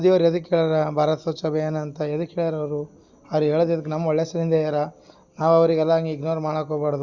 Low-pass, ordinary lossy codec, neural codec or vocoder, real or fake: 7.2 kHz; Opus, 64 kbps; none; real